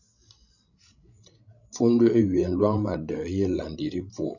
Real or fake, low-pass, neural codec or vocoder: fake; 7.2 kHz; codec, 16 kHz, 16 kbps, FreqCodec, larger model